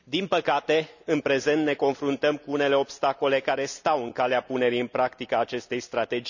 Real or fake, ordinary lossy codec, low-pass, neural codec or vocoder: real; none; 7.2 kHz; none